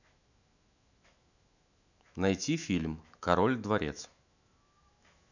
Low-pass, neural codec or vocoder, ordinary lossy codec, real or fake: 7.2 kHz; autoencoder, 48 kHz, 128 numbers a frame, DAC-VAE, trained on Japanese speech; none; fake